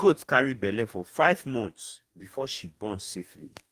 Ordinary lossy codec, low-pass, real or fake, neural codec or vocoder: Opus, 32 kbps; 14.4 kHz; fake; codec, 44.1 kHz, 2.6 kbps, DAC